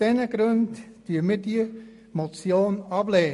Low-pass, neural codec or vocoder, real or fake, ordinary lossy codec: 14.4 kHz; none; real; MP3, 48 kbps